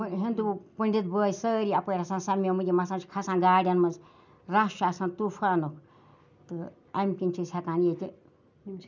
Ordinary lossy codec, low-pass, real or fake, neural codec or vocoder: none; 7.2 kHz; real; none